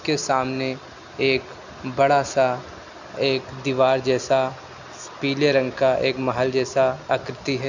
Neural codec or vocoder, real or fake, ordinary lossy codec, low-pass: none; real; none; 7.2 kHz